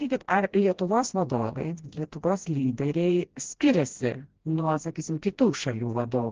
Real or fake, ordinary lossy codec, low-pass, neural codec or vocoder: fake; Opus, 16 kbps; 7.2 kHz; codec, 16 kHz, 1 kbps, FreqCodec, smaller model